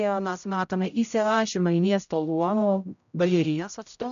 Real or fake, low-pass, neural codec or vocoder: fake; 7.2 kHz; codec, 16 kHz, 0.5 kbps, X-Codec, HuBERT features, trained on general audio